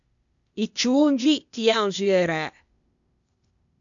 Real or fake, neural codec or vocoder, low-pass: fake; codec, 16 kHz, 0.8 kbps, ZipCodec; 7.2 kHz